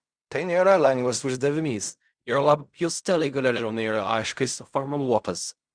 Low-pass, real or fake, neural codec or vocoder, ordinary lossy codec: 9.9 kHz; fake; codec, 16 kHz in and 24 kHz out, 0.4 kbps, LongCat-Audio-Codec, fine tuned four codebook decoder; Opus, 64 kbps